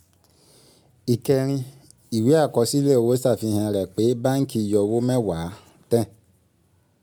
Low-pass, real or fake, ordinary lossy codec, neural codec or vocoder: none; real; none; none